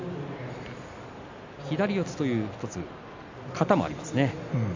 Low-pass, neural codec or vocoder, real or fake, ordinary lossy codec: 7.2 kHz; none; real; MP3, 64 kbps